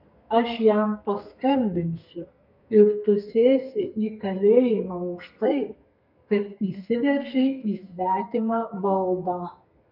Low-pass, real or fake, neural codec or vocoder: 5.4 kHz; fake; codec, 32 kHz, 1.9 kbps, SNAC